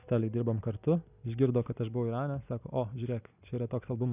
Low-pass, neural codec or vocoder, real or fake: 3.6 kHz; none; real